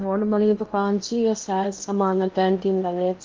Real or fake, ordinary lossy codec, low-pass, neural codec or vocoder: fake; Opus, 24 kbps; 7.2 kHz; codec, 16 kHz in and 24 kHz out, 0.8 kbps, FocalCodec, streaming, 65536 codes